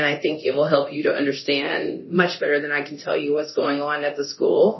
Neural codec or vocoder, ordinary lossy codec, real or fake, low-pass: codec, 24 kHz, 0.9 kbps, DualCodec; MP3, 24 kbps; fake; 7.2 kHz